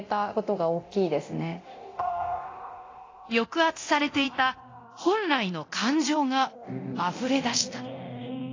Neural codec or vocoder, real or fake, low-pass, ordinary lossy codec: codec, 24 kHz, 0.9 kbps, DualCodec; fake; 7.2 kHz; AAC, 32 kbps